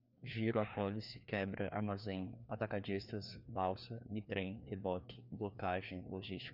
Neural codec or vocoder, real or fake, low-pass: codec, 16 kHz, 2 kbps, FreqCodec, larger model; fake; 5.4 kHz